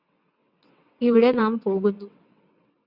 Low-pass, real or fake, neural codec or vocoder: 5.4 kHz; fake; vocoder, 22.05 kHz, 80 mel bands, WaveNeXt